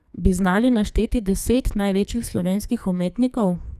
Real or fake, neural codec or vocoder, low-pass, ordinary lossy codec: fake; codec, 44.1 kHz, 2.6 kbps, SNAC; 14.4 kHz; none